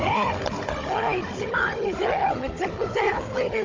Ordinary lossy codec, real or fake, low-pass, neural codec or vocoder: Opus, 32 kbps; fake; 7.2 kHz; codec, 16 kHz, 16 kbps, FunCodec, trained on Chinese and English, 50 frames a second